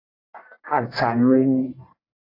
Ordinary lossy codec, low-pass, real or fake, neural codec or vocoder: AAC, 24 kbps; 5.4 kHz; fake; codec, 44.1 kHz, 1.7 kbps, Pupu-Codec